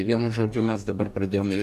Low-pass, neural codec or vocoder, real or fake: 14.4 kHz; codec, 44.1 kHz, 2.6 kbps, DAC; fake